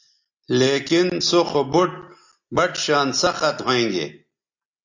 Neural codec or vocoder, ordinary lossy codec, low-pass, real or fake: none; AAC, 48 kbps; 7.2 kHz; real